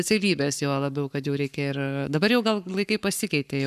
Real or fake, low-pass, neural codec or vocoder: fake; 14.4 kHz; codec, 44.1 kHz, 7.8 kbps, Pupu-Codec